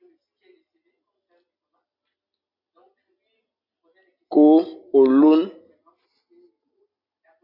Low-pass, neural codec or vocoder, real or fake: 5.4 kHz; none; real